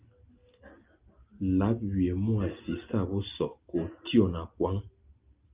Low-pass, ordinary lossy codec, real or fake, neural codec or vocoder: 3.6 kHz; Opus, 24 kbps; real; none